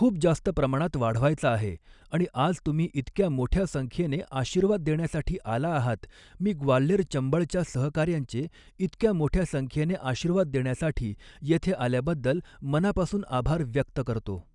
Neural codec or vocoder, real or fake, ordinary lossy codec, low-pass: none; real; none; 10.8 kHz